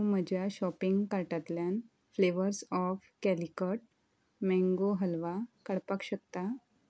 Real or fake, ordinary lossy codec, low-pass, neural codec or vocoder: real; none; none; none